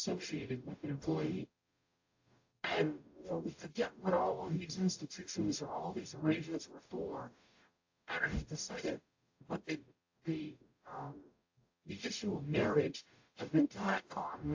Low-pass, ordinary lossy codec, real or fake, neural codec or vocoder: 7.2 kHz; AAC, 48 kbps; fake; codec, 44.1 kHz, 0.9 kbps, DAC